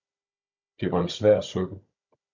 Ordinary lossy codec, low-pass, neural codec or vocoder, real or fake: MP3, 64 kbps; 7.2 kHz; codec, 16 kHz, 16 kbps, FunCodec, trained on Chinese and English, 50 frames a second; fake